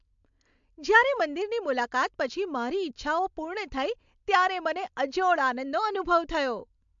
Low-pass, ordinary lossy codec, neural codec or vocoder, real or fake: 7.2 kHz; MP3, 64 kbps; none; real